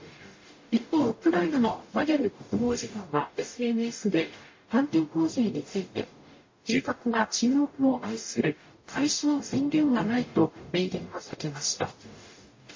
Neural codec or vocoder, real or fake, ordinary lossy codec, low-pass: codec, 44.1 kHz, 0.9 kbps, DAC; fake; MP3, 32 kbps; 7.2 kHz